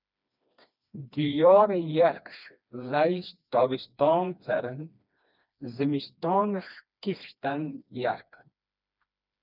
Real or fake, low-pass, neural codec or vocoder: fake; 5.4 kHz; codec, 16 kHz, 2 kbps, FreqCodec, smaller model